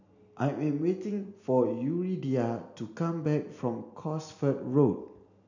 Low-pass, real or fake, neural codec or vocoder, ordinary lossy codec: 7.2 kHz; real; none; none